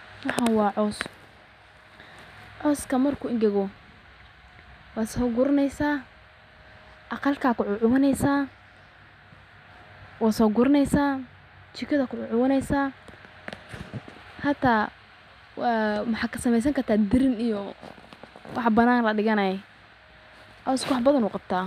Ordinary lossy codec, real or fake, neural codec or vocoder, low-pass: none; real; none; 14.4 kHz